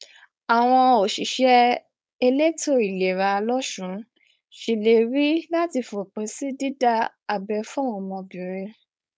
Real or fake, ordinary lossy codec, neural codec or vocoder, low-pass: fake; none; codec, 16 kHz, 4.8 kbps, FACodec; none